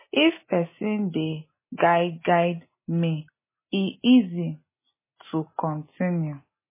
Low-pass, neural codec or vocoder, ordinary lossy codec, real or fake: 3.6 kHz; none; MP3, 16 kbps; real